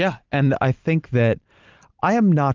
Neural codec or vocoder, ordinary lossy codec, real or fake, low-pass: none; Opus, 32 kbps; real; 7.2 kHz